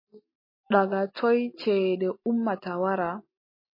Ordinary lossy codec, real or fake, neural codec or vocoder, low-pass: MP3, 24 kbps; real; none; 5.4 kHz